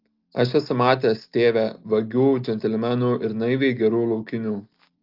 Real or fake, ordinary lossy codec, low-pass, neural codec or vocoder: real; Opus, 32 kbps; 5.4 kHz; none